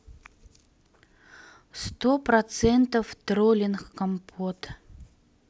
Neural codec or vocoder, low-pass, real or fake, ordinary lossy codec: none; none; real; none